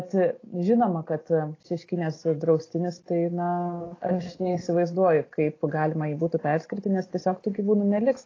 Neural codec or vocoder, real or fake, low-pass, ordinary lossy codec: none; real; 7.2 kHz; AAC, 32 kbps